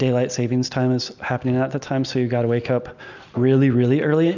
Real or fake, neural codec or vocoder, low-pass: real; none; 7.2 kHz